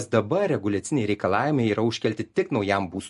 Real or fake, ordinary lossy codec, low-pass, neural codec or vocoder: real; MP3, 48 kbps; 14.4 kHz; none